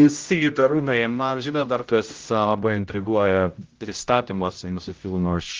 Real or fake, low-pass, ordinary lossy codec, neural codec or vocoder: fake; 7.2 kHz; Opus, 32 kbps; codec, 16 kHz, 0.5 kbps, X-Codec, HuBERT features, trained on general audio